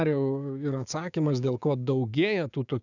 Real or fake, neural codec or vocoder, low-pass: fake; codec, 16 kHz, 6 kbps, DAC; 7.2 kHz